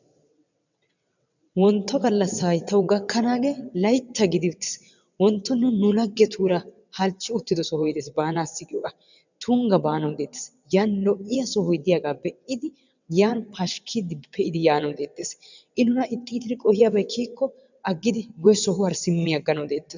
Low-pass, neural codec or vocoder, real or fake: 7.2 kHz; vocoder, 22.05 kHz, 80 mel bands, WaveNeXt; fake